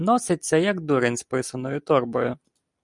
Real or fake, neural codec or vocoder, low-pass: real; none; 10.8 kHz